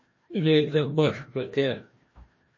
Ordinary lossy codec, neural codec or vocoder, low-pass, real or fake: MP3, 32 kbps; codec, 16 kHz, 1 kbps, FreqCodec, larger model; 7.2 kHz; fake